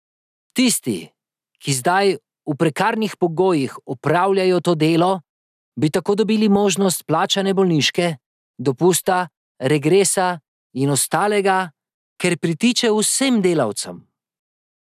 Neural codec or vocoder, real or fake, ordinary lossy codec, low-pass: none; real; none; 14.4 kHz